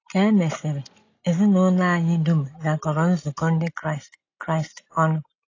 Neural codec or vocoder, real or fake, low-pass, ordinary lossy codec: none; real; 7.2 kHz; AAC, 32 kbps